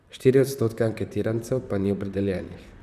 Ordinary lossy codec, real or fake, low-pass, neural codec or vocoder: none; fake; 14.4 kHz; vocoder, 44.1 kHz, 128 mel bands, Pupu-Vocoder